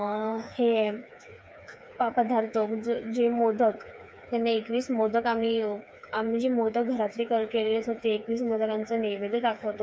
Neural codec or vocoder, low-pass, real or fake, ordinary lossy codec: codec, 16 kHz, 4 kbps, FreqCodec, smaller model; none; fake; none